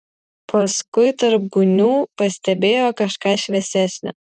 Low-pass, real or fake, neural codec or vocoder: 10.8 kHz; fake; vocoder, 44.1 kHz, 128 mel bands, Pupu-Vocoder